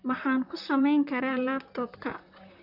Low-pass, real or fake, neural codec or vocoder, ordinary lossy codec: 5.4 kHz; fake; vocoder, 44.1 kHz, 128 mel bands, Pupu-Vocoder; none